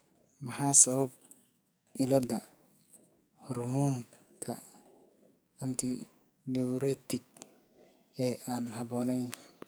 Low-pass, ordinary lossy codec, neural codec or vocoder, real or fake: none; none; codec, 44.1 kHz, 2.6 kbps, SNAC; fake